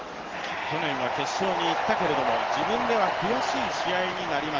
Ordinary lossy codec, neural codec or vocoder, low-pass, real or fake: Opus, 16 kbps; none; 7.2 kHz; real